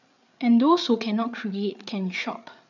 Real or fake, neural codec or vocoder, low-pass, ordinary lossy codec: fake; codec, 16 kHz, 8 kbps, FreqCodec, larger model; 7.2 kHz; none